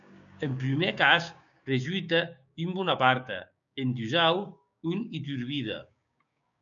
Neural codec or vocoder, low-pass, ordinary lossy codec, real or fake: codec, 16 kHz, 6 kbps, DAC; 7.2 kHz; MP3, 96 kbps; fake